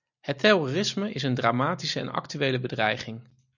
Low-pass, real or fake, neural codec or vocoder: 7.2 kHz; real; none